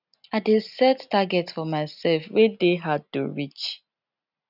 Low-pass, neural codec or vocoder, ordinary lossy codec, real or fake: 5.4 kHz; none; none; real